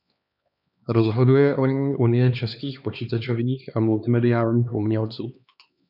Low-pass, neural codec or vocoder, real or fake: 5.4 kHz; codec, 16 kHz, 2 kbps, X-Codec, HuBERT features, trained on LibriSpeech; fake